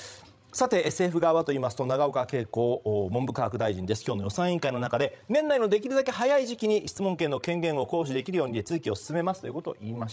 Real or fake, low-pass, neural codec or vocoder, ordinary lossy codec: fake; none; codec, 16 kHz, 16 kbps, FreqCodec, larger model; none